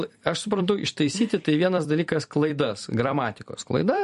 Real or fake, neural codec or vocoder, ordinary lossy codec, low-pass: fake; vocoder, 44.1 kHz, 128 mel bands every 256 samples, BigVGAN v2; MP3, 48 kbps; 14.4 kHz